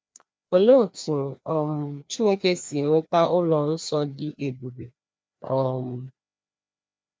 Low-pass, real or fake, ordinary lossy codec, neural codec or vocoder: none; fake; none; codec, 16 kHz, 2 kbps, FreqCodec, larger model